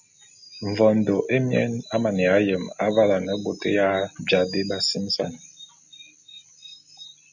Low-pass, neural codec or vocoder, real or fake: 7.2 kHz; none; real